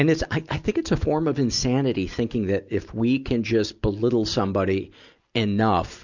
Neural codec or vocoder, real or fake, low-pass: none; real; 7.2 kHz